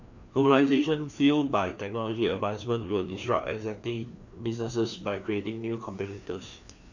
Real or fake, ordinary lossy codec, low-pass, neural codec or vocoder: fake; none; 7.2 kHz; codec, 16 kHz, 2 kbps, FreqCodec, larger model